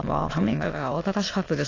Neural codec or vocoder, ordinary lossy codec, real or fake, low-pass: autoencoder, 22.05 kHz, a latent of 192 numbers a frame, VITS, trained on many speakers; AAC, 32 kbps; fake; 7.2 kHz